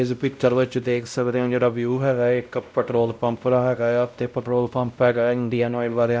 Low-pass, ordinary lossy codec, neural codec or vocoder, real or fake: none; none; codec, 16 kHz, 0.5 kbps, X-Codec, WavLM features, trained on Multilingual LibriSpeech; fake